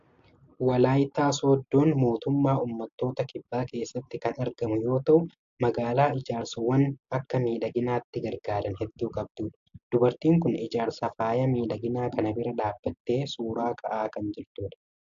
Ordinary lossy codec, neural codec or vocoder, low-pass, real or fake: AAC, 64 kbps; none; 7.2 kHz; real